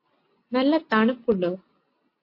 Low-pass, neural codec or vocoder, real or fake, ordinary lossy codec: 5.4 kHz; none; real; MP3, 32 kbps